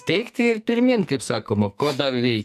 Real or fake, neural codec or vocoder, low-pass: fake; codec, 44.1 kHz, 2.6 kbps, SNAC; 14.4 kHz